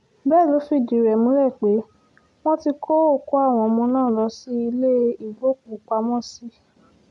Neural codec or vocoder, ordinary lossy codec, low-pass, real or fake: none; AAC, 64 kbps; 10.8 kHz; real